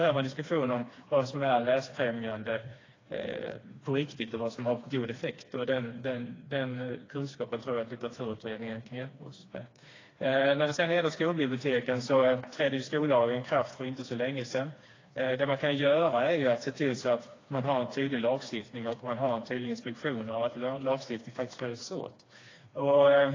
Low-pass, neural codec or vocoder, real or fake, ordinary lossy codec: 7.2 kHz; codec, 16 kHz, 2 kbps, FreqCodec, smaller model; fake; AAC, 32 kbps